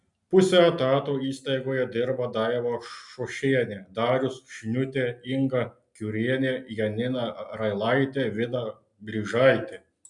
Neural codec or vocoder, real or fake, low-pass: none; real; 10.8 kHz